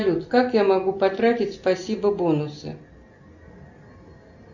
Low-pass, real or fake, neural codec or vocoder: 7.2 kHz; real; none